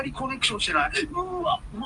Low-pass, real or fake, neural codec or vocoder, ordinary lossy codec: 10.8 kHz; real; none; Opus, 32 kbps